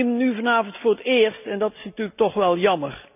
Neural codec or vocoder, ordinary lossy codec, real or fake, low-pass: none; none; real; 3.6 kHz